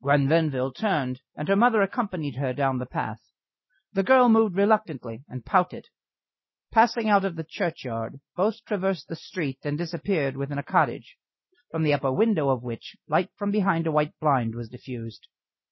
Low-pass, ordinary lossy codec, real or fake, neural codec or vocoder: 7.2 kHz; MP3, 24 kbps; real; none